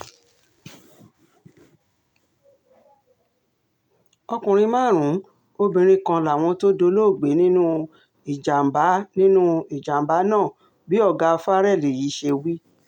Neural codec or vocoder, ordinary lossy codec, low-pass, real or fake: none; none; 19.8 kHz; real